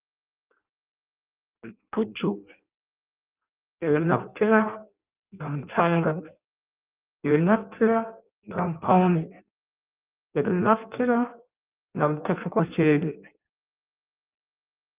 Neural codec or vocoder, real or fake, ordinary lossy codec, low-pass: codec, 16 kHz in and 24 kHz out, 0.6 kbps, FireRedTTS-2 codec; fake; Opus, 24 kbps; 3.6 kHz